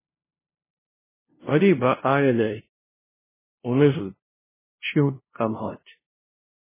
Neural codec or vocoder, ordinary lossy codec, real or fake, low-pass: codec, 16 kHz, 0.5 kbps, FunCodec, trained on LibriTTS, 25 frames a second; MP3, 16 kbps; fake; 3.6 kHz